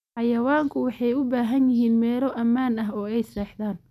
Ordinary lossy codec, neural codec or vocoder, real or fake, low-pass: none; none; real; 14.4 kHz